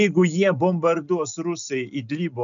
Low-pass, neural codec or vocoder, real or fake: 7.2 kHz; none; real